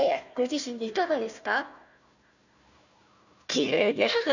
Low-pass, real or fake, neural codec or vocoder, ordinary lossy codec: 7.2 kHz; fake; codec, 16 kHz, 1 kbps, FunCodec, trained on Chinese and English, 50 frames a second; none